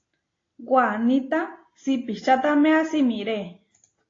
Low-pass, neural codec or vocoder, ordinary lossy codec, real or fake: 7.2 kHz; none; AAC, 32 kbps; real